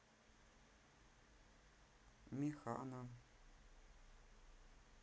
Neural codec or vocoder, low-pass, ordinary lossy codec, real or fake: none; none; none; real